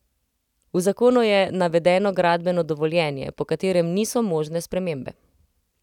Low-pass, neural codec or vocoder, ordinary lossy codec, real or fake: 19.8 kHz; none; none; real